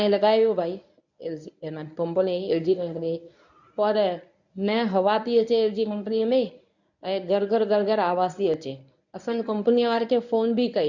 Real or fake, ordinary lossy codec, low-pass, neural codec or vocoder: fake; none; 7.2 kHz; codec, 24 kHz, 0.9 kbps, WavTokenizer, medium speech release version 1